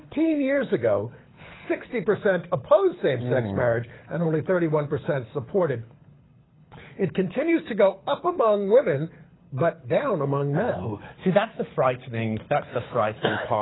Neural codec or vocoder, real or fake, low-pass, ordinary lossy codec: codec, 24 kHz, 6 kbps, HILCodec; fake; 7.2 kHz; AAC, 16 kbps